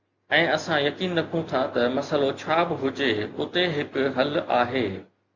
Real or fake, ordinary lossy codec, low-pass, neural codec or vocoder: real; Opus, 64 kbps; 7.2 kHz; none